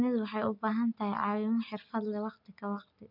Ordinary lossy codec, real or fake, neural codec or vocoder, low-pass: none; real; none; 5.4 kHz